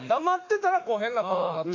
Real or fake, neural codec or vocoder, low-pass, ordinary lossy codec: fake; autoencoder, 48 kHz, 32 numbers a frame, DAC-VAE, trained on Japanese speech; 7.2 kHz; AAC, 48 kbps